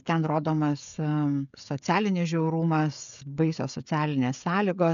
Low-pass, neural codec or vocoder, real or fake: 7.2 kHz; codec, 16 kHz, 16 kbps, FreqCodec, smaller model; fake